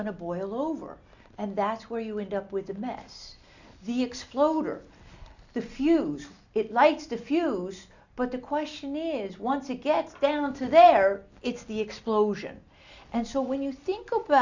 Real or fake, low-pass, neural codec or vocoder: real; 7.2 kHz; none